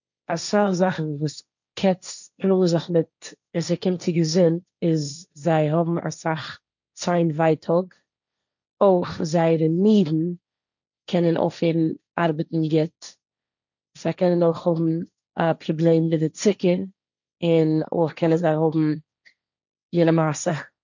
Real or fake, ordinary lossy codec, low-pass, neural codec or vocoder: fake; none; 7.2 kHz; codec, 16 kHz, 1.1 kbps, Voila-Tokenizer